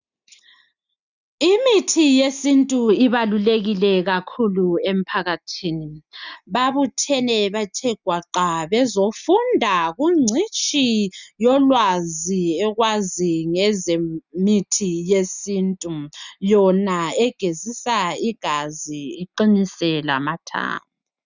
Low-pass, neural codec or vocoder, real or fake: 7.2 kHz; none; real